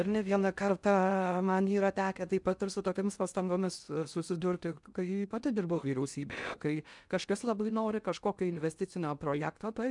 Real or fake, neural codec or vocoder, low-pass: fake; codec, 16 kHz in and 24 kHz out, 0.6 kbps, FocalCodec, streaming, 4096 codes; 10.8 kHz